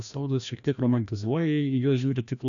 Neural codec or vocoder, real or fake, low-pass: codec, 16 kHz, 1 kbps, FreqCodec, larger model; fake; 7.2 kHz